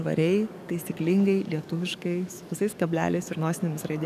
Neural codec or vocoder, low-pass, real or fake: codec, 44.1 kHz, 7.8 kbps, DAC; 14.4 kHz; fake